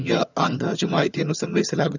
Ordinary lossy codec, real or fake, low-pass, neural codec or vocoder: none; fake; 7.2 kHz; vocoder, 22.05 kHz, 80 mel bands, HiFi-GAN